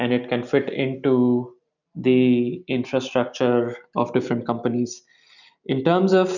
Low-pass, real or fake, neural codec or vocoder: 7.2 kHz; real; none